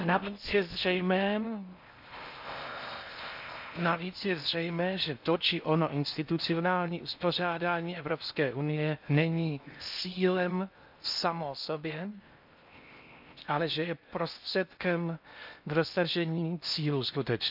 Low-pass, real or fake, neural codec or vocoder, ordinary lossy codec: 5.4 kHz; fake; codec, 16 kHz in and 24 kHz out, 0.6 kbps, FocalCodec, streaming, 4096 codes; none